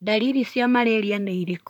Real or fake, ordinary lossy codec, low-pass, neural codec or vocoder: fake; none; 19.8 kHz; vocoder, 44.1 kHz, 128 mel bands, Pupu-Vocoder